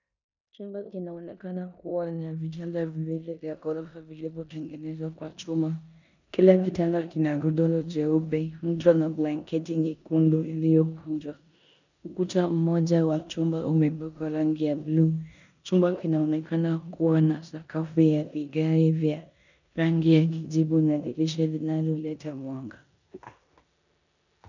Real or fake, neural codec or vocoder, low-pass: fake; codec, 16 kHz in and 24 kHz out, 0.9 kbps, LongCat-Audio-Codec, four codebook decoder; 7.2 kHz